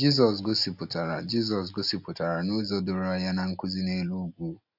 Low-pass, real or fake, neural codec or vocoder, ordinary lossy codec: 5.4 kHz; real; none; none